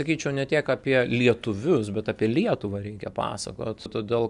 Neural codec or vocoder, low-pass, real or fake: none; 10.8 kHz; real